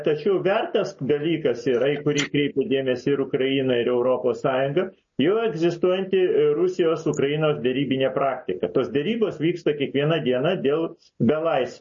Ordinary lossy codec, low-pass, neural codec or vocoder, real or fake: MP3, 32 kbps; 7.2 kHz; none; real